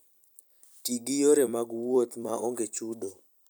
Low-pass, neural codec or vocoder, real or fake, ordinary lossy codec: none; vocoder, 44.1 kHz, 128 mel bands every 256 samples, BigVGAN v2; fake; none